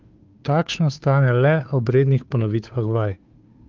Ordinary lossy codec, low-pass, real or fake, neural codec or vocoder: Opus, 24 kbps; 7.2 kHz; fake; codec, 16 kHz, 2 kbps, FunCodec, trained on Chinese and English, 25 frames a second